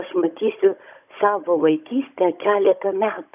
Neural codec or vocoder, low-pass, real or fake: codec, 16 kHz, 16 kbps, FunCodec, trained on Chinese and English, 50 frames a second; 3.6 kHz; fake